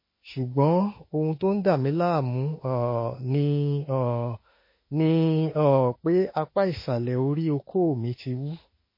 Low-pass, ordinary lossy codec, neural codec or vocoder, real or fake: 5.4 kHz; MP3, 24 kbps; autoencoder, 48 kHz, 32 numbers a frame, DAC-VAE, trained on Japanese speech; fake